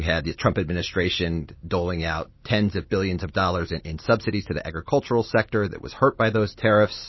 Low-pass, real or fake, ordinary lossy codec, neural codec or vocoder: 7.2 kHz; real; MP3, 24 kbps; none